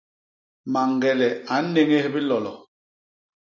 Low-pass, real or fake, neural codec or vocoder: 7.2 kHz; real; none